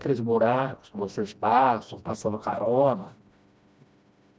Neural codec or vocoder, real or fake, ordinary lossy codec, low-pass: codec, 16 kHz, 1 kbps, FreqCodec, smaller model; fake; none; none